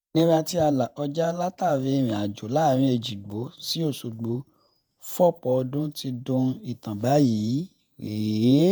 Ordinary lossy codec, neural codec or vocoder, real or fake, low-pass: none; vocoder, 48 kHz, 128 mel bands, Vocos; fake; none